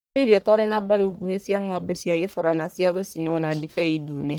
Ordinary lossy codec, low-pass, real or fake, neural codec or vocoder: none; none; fake; codec, 44.1 kHz, 1.7 kbps, Pupu-Codec